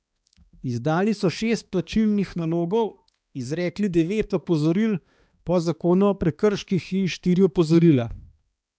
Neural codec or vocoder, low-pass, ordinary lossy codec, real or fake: codec, 16 kHz, 2 kbps, X-Codec, HuBERT features, trained on balanced general audio; none; none; fake